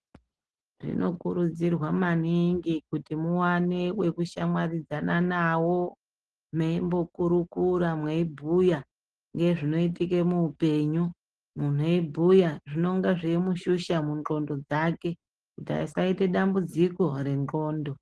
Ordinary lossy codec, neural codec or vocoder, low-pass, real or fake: Opus, 16 kbps; none; 10.8 kHz; real